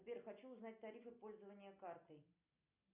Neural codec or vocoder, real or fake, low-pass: none; real; 3.6 kHz